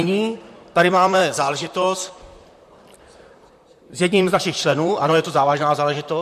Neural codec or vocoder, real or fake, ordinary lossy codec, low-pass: vocoder, 44.1 kHz, 128 mel bands, Pupu-Vocoder; fake; MP3, 64 kbps; 14.4 kHz